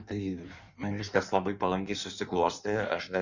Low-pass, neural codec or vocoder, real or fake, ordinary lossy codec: 7.2 kHz; codec, 16 kHz in and 24 kHz out, 1.1 kbps, FireRedTTS-2 codec; fake; Opus, 64 kbps